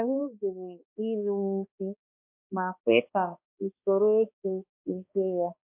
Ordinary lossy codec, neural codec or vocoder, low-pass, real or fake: MP3, 32 kbps; codec, 16 kHz, 2 kbps, X-Codec, HuBERT features, trained on balanced general audio; 3.6 kHz; fake